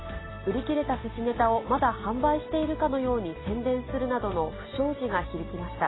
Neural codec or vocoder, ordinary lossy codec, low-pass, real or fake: none; AAC, 16 kbps; 7.2 kHz; real